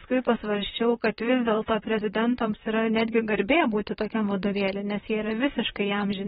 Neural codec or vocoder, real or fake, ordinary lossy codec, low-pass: vocoder, 44.1 kHz, 128 mel bands, Pupu-Vocoder; fake; AAC, 16 kbps; 19.8 kHz